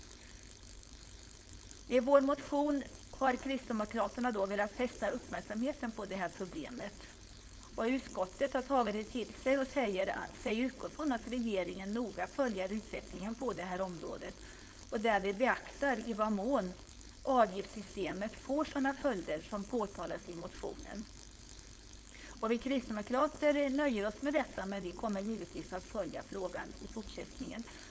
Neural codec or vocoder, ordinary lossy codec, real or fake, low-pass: codec, 16 kHz, 4.8 kbps, FACodec; none; fake; none